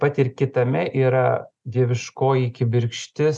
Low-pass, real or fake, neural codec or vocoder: 10.8 kHz; real; none